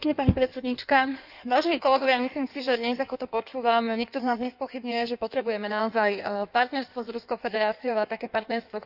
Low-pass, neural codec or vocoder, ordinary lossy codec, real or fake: 5.4 kHz; codec, 16 kHz in and 24 kHz out, 1.1 kbps, FireRedTTS-2 codec; none; fake